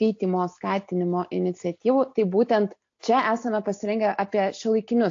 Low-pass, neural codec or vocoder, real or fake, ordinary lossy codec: 7.2 kHz; none; real; AAC, 48 kbps